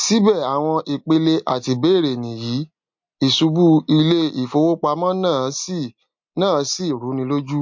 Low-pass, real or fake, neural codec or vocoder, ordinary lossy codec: 7.2 kHz; real; none; MP3, 48 kbps